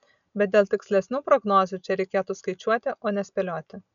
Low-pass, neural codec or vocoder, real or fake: 7.2 kHz; none; real